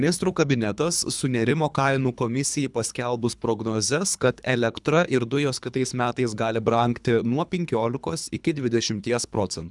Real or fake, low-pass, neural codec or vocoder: fake; 10.8 kHz; codec, 24 kHz, 3 kbps, HILCodec